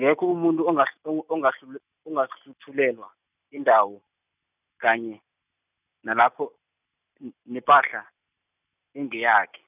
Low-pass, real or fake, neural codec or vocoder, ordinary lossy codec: 3.6 kHz; real; none; none